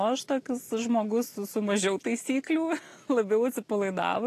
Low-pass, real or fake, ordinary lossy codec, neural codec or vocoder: 14.4 kHz; real; AAC, 48 kbps; none